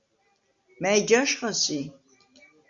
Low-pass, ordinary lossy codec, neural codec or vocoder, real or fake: 7.2 kHz; Opus, 64 kbps; none; real